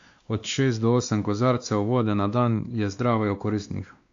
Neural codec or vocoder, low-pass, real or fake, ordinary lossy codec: codec, 16 kHz, 2 kbps, X-Codec, WavLM features, trained on Multilingual LibriSpeech; 7.2 kHz; fake; AAC, 64 kbps